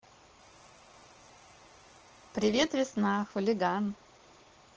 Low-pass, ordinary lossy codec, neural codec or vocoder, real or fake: 7.2 kHz; Opus, 16 kbps; none; real